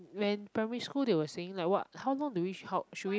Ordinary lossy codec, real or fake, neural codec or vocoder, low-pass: none; real; none; none